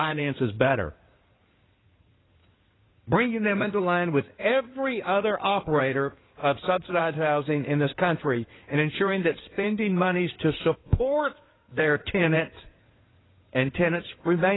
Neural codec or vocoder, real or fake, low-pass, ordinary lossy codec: codec, 16 kHz in and 24 kHz out, 2.2 kbps, FireRedTTS-2 codec; fake; 7.2 kHz; AAC, 16 kbps